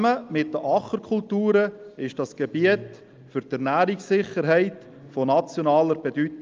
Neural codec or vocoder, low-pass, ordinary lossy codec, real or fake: none; 7.2 kHz; Opus, 24 kbps; real